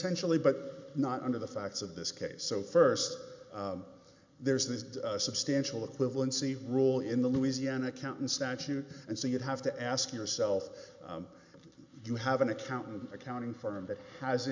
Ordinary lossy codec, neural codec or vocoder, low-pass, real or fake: MP3, 64 kbps; none; 7.2 kHz; real